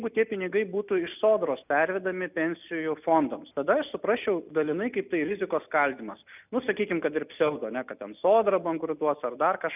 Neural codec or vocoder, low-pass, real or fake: none; 3.6 kHz; real